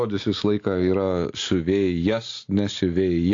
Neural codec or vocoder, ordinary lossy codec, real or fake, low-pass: none; AAC, 48 kbps; real; 7.2 kHz